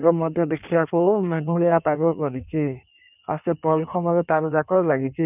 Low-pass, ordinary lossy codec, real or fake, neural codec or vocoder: 3.6 kHz; none; fake; codec, 16 kHz in and 24 kHz out, 1.1 kbps, FireRedTTS-2 codec